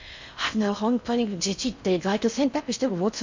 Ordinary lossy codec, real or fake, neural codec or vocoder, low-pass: MP3, 64 kbps; fake; codec, 16 kHz in and 24 kHz out, 0.6 kbps, FocalCodec, streaming, 4096 codes; 7.2 kHz